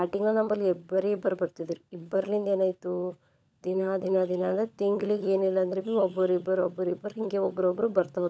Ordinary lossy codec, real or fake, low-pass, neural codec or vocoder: none; fake; none; codec, 16 kHz, 16 kbps, FunCodec, trained on LibriTTS, 50 frames a second